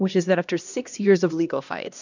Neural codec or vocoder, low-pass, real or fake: codec, 16 kHz, 1 kbps, X-Codec, HuBERT features, trained on LibriSpeech; 7.2 kHz; fake